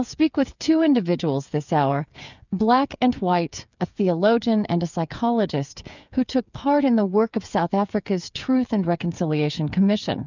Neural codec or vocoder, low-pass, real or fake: codec, 16 kHz, 8 kbps, FreqCodec, smaller model; 7.2 kHz; fake